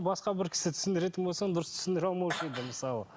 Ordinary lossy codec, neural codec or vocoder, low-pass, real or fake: none; none; none; real